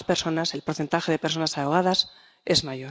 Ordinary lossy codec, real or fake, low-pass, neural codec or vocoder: none; real; none; none